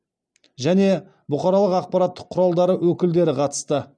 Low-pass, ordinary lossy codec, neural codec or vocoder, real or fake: 9.9 kHz; none; none; real